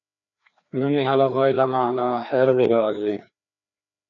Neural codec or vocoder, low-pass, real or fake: codec, 16 kHz, 2 kbps, FreqCodec, larger model; 7.2 kHz; fake